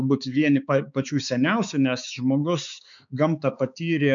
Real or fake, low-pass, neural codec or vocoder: fake; 7.2 kHz; codec, 16 kHz, 4 kbps, X-Codec, HuBERT features, trained on balanced general audio